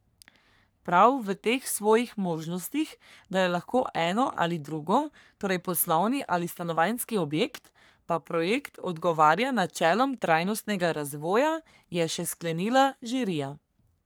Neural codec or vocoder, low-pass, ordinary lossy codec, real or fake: codec, 44.1 kHz, 3.4 kbps, Pupu-Codec; none; none; fake